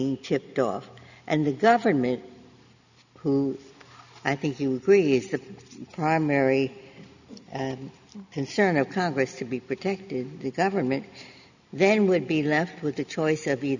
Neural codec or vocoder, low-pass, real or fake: none; 7.2 kHz; real